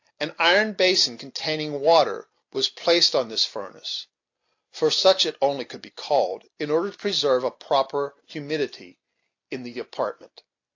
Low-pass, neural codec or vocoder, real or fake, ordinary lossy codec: 7.2 kHz; none; real; AAC, 48 kbps